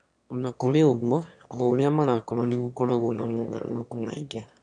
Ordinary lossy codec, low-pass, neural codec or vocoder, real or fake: none; 9.9 kHz; autoencoder, 22.05 kHz, a latent of 192 numbers a frame, VITS, trained on one speaker; fake